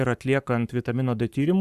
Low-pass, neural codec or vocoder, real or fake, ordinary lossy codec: 14.4 kHz; codec, 44.1 kHz, 7.8 kbps, Pupu-Codec; fake; Opus, 64 kbps